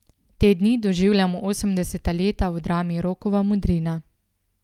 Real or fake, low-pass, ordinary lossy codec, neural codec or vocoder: real; 19.8 kHz; Opus, 32 kbps; none